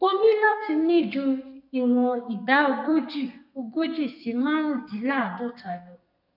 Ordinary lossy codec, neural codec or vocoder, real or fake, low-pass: none; codec, 32 kHz, 1.9 kbps, SNAC; fake; 5.4 kHz